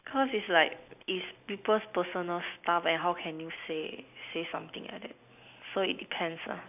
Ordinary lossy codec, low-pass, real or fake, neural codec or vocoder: none; 3.6 kHz; real; none